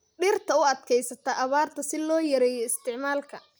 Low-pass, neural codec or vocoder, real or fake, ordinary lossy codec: none; none; real; none